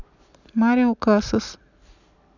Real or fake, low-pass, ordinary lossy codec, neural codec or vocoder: real; 7.2 kHz; none; none